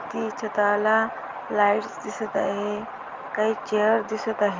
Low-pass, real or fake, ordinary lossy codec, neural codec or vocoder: 7.2 kHz; real; Opus, 32 kbps; none